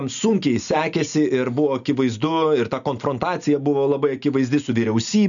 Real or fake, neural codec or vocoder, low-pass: real; none; 7.2 kHz